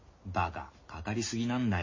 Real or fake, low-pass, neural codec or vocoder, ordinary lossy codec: real; 7.2 kHz; none; MP3, 32 kbps